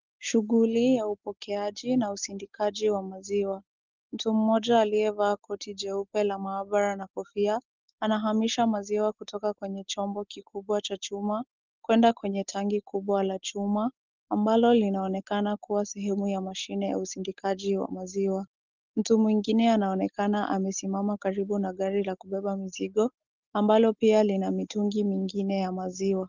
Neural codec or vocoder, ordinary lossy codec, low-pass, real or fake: none; Opus, 16 kbps; 7.2 kHz; real